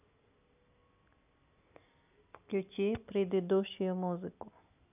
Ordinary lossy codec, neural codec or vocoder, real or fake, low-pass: none; none; real; 3.6 kHz